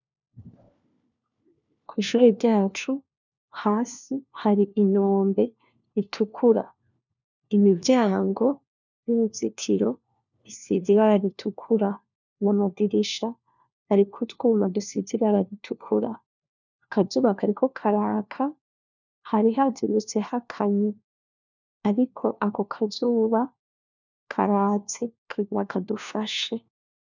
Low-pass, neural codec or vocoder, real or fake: 7.2 kHz; codec, 16 kHz, 1 kbps, FunCodec, trained on LibriTTS, 50 frames a second; fake